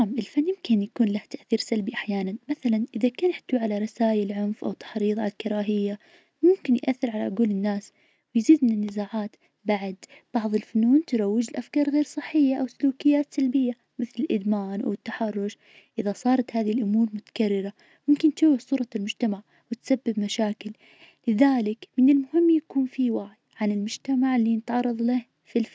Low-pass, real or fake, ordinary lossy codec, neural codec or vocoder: none; real; none; none